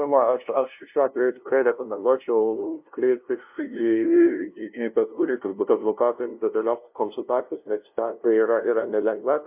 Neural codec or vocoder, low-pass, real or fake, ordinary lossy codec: codec, 16 kHz, 0.5 kbps, FunCodec, trained on LibriTTS, 25 frames a second; 3.6 kHz; fake; MP3, 32 kbps